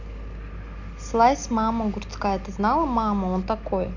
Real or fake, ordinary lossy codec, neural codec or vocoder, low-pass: real; none; none; 7.2 kHz